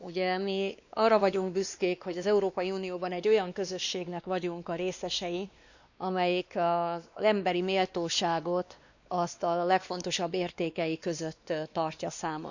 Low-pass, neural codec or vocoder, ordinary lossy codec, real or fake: 7.2 kHz; codec, 16 kHz, 2 kbps, X-Codec, WavLM features, trained on Multilingual LibriSpeech; none; fake